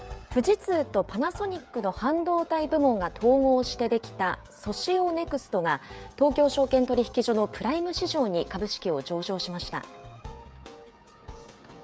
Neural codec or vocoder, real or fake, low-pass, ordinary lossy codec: codec, 16 kHz, 16 kbps, FreqCodec, smaller model; fake; none; none